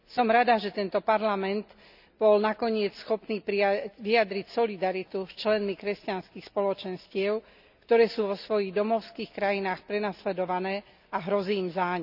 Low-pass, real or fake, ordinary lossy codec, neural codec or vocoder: 5.4 kHz; real; none; none